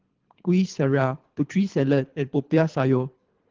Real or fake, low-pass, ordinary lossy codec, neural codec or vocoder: fake; 7.2 kHz; Opus, 16 kbps; codec, 24 kHz, 3 kbps, HILCodec